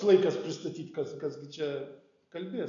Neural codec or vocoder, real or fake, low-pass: none; real; 7.2 kHz